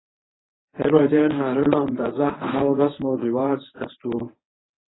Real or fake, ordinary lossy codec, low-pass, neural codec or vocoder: fake; AAC, 16 kbps; 7.2 kHz; codec, 24 kHz, 0.9 kbps, WavTokenizer, medium speech release version 1